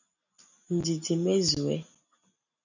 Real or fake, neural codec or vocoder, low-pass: real; none; 7.2 kHz